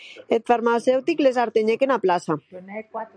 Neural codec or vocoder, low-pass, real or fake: none; 9.9 kHz; real